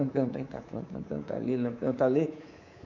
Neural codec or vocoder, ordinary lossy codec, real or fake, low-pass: codec, 24 kHz, 3.1 kbps, DualCodec; none; fake; 7.2 kHz